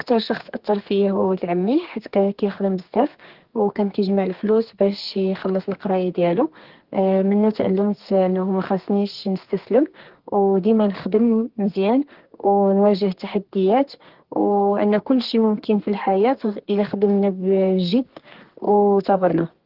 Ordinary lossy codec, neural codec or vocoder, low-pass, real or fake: Opus, 16 kbps; codec, 32 kHz, 1.9 kbps, SNAC; 5.4 kHz; fake